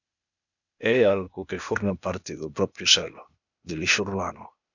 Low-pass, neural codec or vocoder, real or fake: 7.2 kHz; codec, 16 kHz, 0.8 kbps, ZipCodec; fake